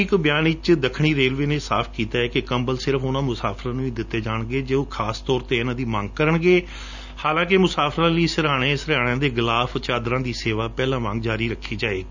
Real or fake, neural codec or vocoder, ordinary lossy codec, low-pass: real; none; none; 7.2 kHz